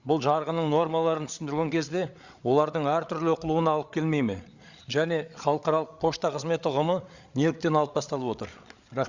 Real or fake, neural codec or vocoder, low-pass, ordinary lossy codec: fake; codec, 16 kHz, 8 kbps, FreqCodec, larger model; 7.2 kHz; Opus, 64 kbps